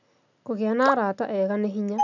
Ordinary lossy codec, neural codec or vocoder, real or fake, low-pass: none; none; real; 7.2 kHz